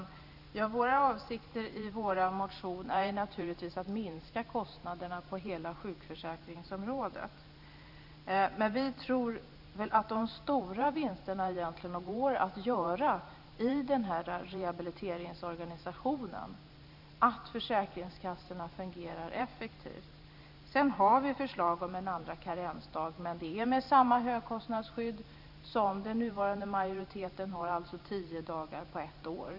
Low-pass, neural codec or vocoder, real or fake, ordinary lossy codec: 5.4 kHz; vocoder, 44.1 kHz, 128 mel bands every 512 samples, BigVGAN v2; fake; none